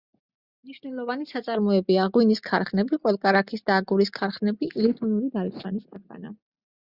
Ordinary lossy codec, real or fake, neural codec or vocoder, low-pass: Opus, 64 kbps; real; none; 5.4 kHz